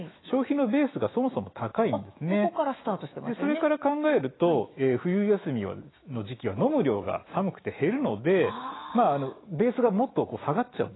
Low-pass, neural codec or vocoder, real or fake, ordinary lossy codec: 7.2 kHz; autoencoder, 48 kHz, 128 numbers a frame, DAC-VAE, trained on Japanese speech; fake; AAC, 16 kbps